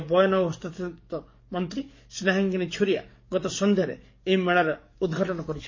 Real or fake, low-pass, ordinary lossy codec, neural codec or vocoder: fake; 7.2 kHz; MP3, 32 kbps; codec, 44.1 kHz, 7.8 kbps, DAC